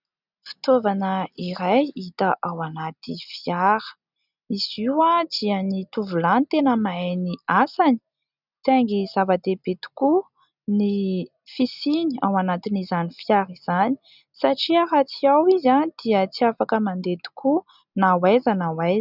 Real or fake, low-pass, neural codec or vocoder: real; 5.4 kHz; none